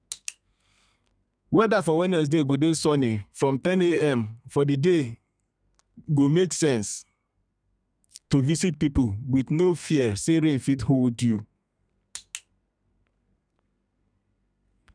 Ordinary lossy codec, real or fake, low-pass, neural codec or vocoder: none; fake; 9.9 kHz; codec, 32 kHz, 1.9 kbps, SNAC